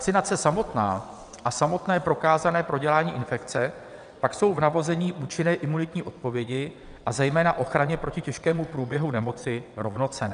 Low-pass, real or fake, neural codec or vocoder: 9.9 kHz; fake; vocoder, 22.05 kHz, 80 mel bands, WaveNeXt